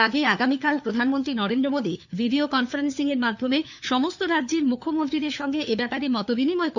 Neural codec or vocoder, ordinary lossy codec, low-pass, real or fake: codec, 16 kHz, 4 kbps, FunCodec, trained on LibriTTS, 50 frames a second; none; 7.2 kHz; fake